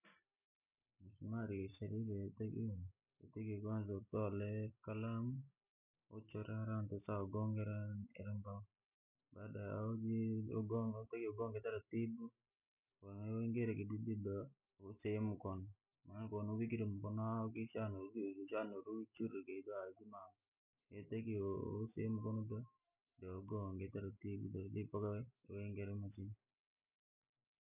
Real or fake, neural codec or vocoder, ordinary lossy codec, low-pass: real; none; none; 3.6 kHz